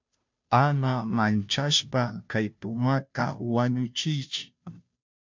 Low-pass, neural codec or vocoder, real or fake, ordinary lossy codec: 7.2 kHz; codec, 16 kHz, 0.5 kbps, FunCodec, trained on Chinese and English, 25 frames a second; fake; MP3, 64 kbps